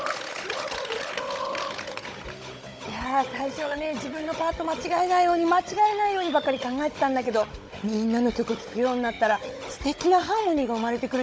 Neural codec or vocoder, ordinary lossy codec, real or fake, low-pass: codec, 16 kHz, 16 kbps, FunCodec, trained on Chinese and English, 50 frames a second; none; fake; none